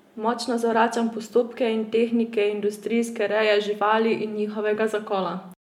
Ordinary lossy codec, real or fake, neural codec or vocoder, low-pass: MP3, 96 kbps; real; none; 19.8 kHz